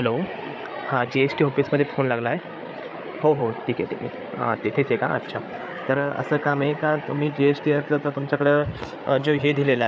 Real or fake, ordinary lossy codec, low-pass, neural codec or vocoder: fake; none; none; codec, 16 kHz, 8 kbps, FreqCodec, larger model